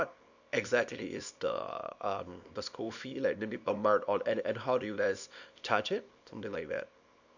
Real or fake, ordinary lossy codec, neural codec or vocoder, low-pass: fake; none; codec, 24 kHz, 0.9 kbps, WavTokenizer, small release; 7.2 kHz